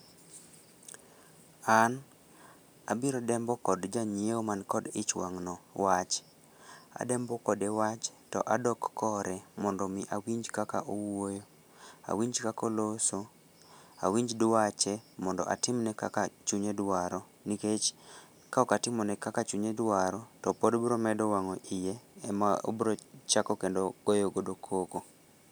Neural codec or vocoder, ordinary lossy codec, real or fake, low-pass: none; none; real; none